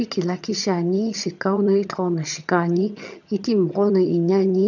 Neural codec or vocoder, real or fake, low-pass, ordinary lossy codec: vocoder, 22.05 kHz, 80 mel bands, HiFi-GAN; fake; 7.2 kHz; none